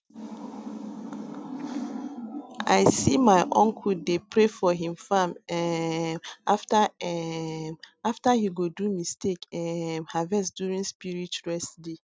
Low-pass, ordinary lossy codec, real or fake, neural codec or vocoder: none; none; real; none